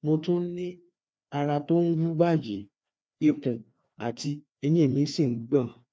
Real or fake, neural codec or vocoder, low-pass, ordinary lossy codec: fake; codec, 16 kHz, 2 kbps, FreqCodec, larger model; none; none